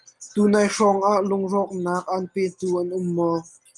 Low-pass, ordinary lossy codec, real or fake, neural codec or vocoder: 10.8 kHz; Opus, 32 kbps; real; none